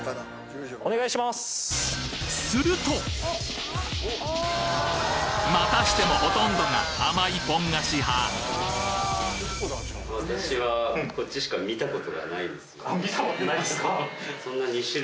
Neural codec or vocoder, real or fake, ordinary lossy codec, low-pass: none; real; none; none